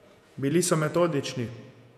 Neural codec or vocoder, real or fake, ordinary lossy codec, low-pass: vocoder, 48 kHz, 128 mel bands, Vocos; fake; none; 14.4 kHz